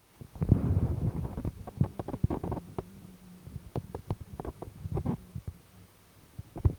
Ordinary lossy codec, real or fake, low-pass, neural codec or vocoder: Opus, 24 kbps; fake; 19.8 kHz; vocoder, 44.1 kHz, 128 mel bands, Pupu-Vocoder